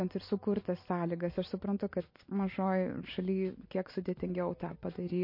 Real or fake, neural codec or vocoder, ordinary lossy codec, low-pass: real; none; MP3, 32 kbps; 5.4 kHz